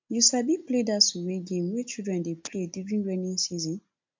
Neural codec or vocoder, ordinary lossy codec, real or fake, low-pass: none; MP3, 64 kbps; real; 7.2 kHz